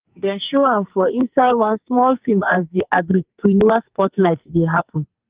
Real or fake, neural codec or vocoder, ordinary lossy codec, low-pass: fake; codec, 44.1 kHz, 2.6 kbps, SNAC; Opus, 32 kbps; 3.6 kHz